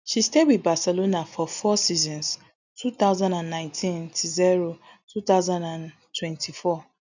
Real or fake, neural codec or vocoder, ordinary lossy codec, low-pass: real; none; none; 7.2 kHz